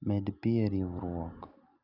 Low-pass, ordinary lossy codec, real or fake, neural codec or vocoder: 5.4 kHz; none; real; none